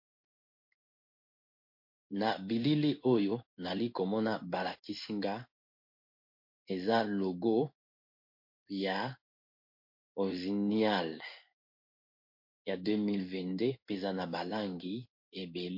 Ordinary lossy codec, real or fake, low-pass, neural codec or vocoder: MP3, 32 kbps; fake; 5.4 kHz; codec, 16 kHz in and 24 kHz out, 1 kbps, XY-Tokenizer